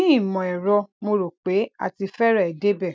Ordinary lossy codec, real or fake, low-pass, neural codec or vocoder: none; real; none; none